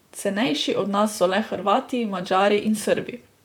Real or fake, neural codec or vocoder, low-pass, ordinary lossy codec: fake; vocoder, 44.1 kHz, 128 mel bands, Pupu-Vocoder; 19.8 kHz; none